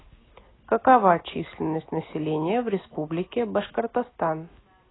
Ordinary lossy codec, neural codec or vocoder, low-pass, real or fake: AAC, 16 kbps; none; 7.2 kHz; real